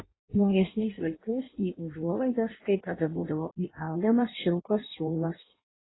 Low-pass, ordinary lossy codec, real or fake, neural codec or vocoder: 7.2 kHz; AAC, 16 kbps; fake; codec, 16 kHz in and 24 kHz out, 0.6 kbps, FireRedTTS-2 codec